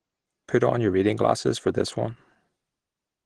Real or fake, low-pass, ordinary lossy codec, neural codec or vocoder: real; 9.9 kHz; Opus, 16 kbps; none